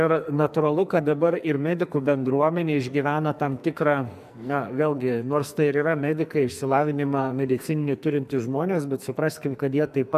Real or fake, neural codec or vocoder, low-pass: fake; codec, 44.1 kHz, 2.6 kbps, SNAC; 14.4 kHz